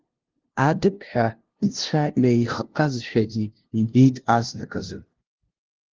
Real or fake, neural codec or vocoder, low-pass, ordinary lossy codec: fake; codec, 16 kHz, 0.5 kbps, FunCodec, trained on LibriTTS, 25 frames a second; 7.2 kHz; Opus, 16 kbps